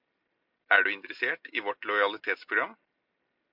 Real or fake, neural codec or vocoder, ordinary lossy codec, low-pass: real; none; MP3, 48 kbps; 5.4 kHz